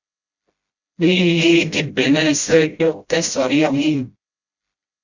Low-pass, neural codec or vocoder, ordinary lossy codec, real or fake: 7.2 kHz; codec, 16 kHz, 0.5 kbps, FreqCodec, smaller model; Opus, 64 kbps; fake